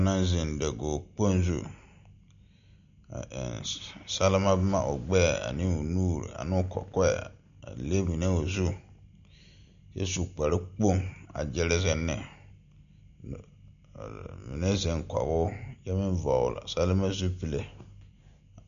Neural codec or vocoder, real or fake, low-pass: none; real; 7.2 kHz